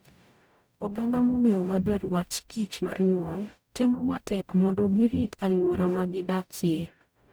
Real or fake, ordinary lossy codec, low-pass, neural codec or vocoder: fake; none; none; codec, 44.1 kHz, 0.9 kbps, DAC